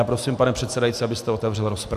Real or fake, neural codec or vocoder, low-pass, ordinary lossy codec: real; none; 14.4 kHz; AAC, 96 kbps